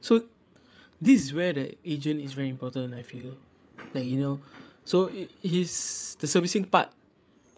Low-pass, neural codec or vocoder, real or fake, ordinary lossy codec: none; codec, 16 kHz, 8 kbps, FreqCodec, larger model; fake; none